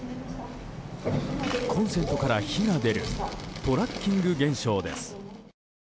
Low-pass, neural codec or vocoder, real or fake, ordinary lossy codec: none; none; real; none